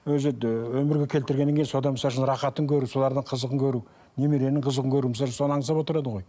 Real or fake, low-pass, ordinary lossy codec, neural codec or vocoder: real; none; none; none